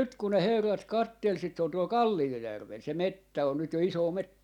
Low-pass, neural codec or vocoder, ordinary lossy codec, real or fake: 19.8 kHz; none; none; real